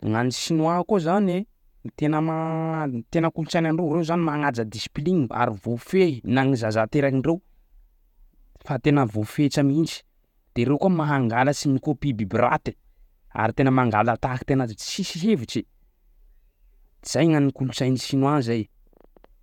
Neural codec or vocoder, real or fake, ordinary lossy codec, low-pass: vocoder, 48 kHz, 128 mel bands, Vocos; fake; none; 19.8 kHz